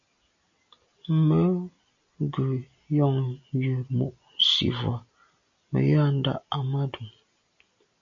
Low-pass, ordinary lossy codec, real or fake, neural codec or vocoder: 7.2 kHz; AAC, 64 kbps; real; none